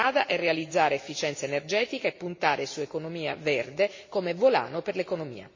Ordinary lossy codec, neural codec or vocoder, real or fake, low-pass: MP3, 32 kbps; none; real; 7.2 kHz